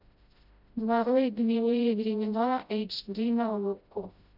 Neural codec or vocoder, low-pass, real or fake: codec, 16 kHz, 0.5 kbps, FreqCodec, smaller model; 5.4 kHz; fake